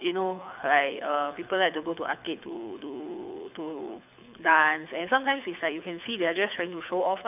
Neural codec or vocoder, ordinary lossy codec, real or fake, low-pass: codec, 24 kHz, 6 kbps, HILCodec; none; fake; 3.6 kHz